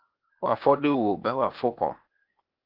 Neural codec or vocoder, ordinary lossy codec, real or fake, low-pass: codec, 16 kHz, 0.8 kbps, ZipCodec; Opus, 32 kbps; fake; 5.4 kHz